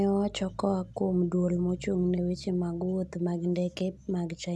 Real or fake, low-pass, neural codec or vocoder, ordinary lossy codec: real; none; none; none